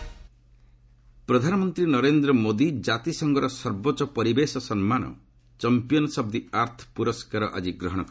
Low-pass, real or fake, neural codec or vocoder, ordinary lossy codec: none; real; none; none